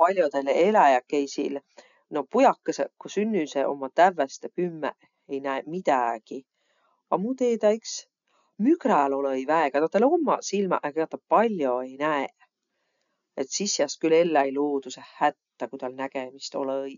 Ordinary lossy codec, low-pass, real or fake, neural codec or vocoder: none; 7.2 kHz; real; none